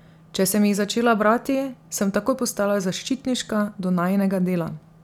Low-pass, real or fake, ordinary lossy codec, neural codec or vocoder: 19.8 kHz; real; none; none